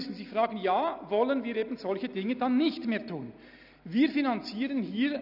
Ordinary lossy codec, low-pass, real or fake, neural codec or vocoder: none; 5.4 kHz; real; none